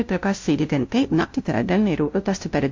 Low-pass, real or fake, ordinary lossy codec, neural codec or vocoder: 7.2 kHz; fake; MP3, 48 kbps; codec, 16 kHz, 0.5 kbps, FunCodec, trained on LibriTTS, 25 frames a second